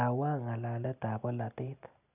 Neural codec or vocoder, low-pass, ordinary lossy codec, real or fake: none; 3.6 kHz; none; real